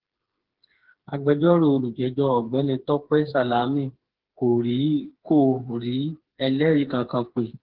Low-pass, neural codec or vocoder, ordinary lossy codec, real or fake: 5.4 kHz; codec, 16 kHz, 4 kbps, FreqCodec, smaller model; Opus, 16 kbps; fake